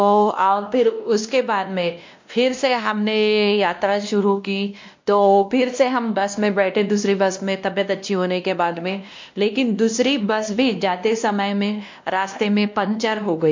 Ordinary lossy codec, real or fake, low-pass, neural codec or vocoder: MP3, 64 kbps; fake; 7.2 kHz; codec, 16 kHz, 1 kbps, X-Codec, WavLM features, trained on Multilingual LibriSpeech